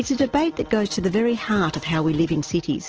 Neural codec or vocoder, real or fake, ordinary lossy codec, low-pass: none; real; Opus, 16 kbps; 7.2 kHz